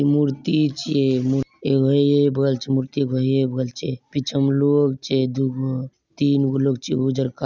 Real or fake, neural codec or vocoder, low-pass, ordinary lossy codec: real; none; 7.2 kHz; none